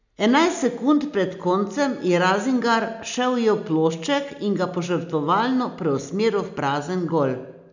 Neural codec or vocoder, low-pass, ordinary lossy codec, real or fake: none; 7.2 kHz; none; real